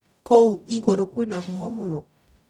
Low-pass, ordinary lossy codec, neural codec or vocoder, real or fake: 19.8 kHz; none; codec, 44.1 kHz, 0.9 kbps, DAC; fake